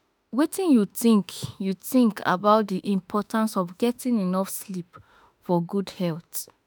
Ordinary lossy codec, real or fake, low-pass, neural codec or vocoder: none; fake; none; autoencoder, 48 kHz, 32 numbers a frame, DAC-VAE, trained on Japanese speech